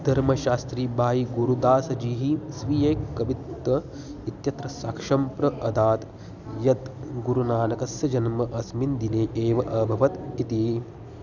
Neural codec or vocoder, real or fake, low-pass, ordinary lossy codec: none; real; 7.2 kHz; none